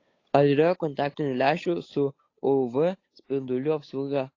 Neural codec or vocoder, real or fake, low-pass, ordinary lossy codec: codec, 16 kHz, 8 kbps, FunCodec, trained on Chinese and English, 25 frames a second; fake; 7.2 kHz; AAC, 32 kbps